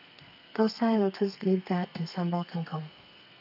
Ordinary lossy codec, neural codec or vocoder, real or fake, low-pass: none; codec, 32 kHz, 1.9 kbps, SNAC; fake; 5.4 kHz